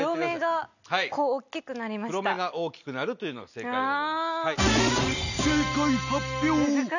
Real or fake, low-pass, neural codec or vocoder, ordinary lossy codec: real; 7.2 kHz; none; none